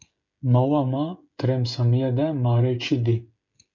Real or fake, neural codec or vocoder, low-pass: fake; codec, 16 kHz, 16 kbps, FreqCodec, smaller model; 7.2 kHz